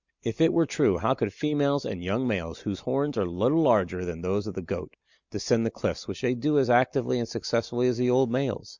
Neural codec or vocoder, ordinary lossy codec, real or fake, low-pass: none; Opus, 64 kbps; real; 7.2 kHz